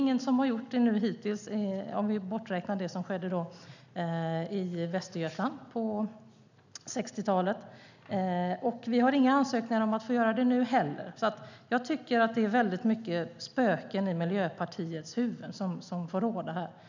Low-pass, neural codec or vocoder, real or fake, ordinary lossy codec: 7.2 kHz; none; real; none